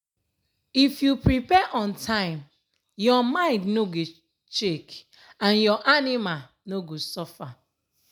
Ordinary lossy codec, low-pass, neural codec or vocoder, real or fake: none; none; none; real